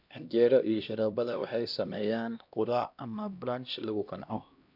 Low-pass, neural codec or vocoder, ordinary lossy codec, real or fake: 5.4 kHz; codec, 16 kHz, 1 kbps, X-Codec, HuBERT features, trained on LibriSpeech; none; fake